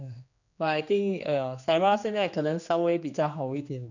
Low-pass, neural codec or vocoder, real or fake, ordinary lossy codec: 7.2 kHz; codec, 16 kHz, 2 kbps, X-Codec, HuBERT features, trained on general audio; fake; none